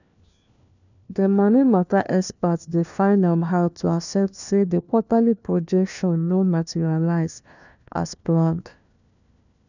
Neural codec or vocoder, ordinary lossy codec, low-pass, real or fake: codec, 16 kHz, 1 kbps, FunCodec, trained on LibriTTS, 50 frames a second; none; 7.2 kHz; fake